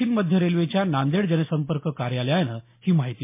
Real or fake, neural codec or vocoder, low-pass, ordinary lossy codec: real; none; 3.6 kHz; MP3, 24 kbps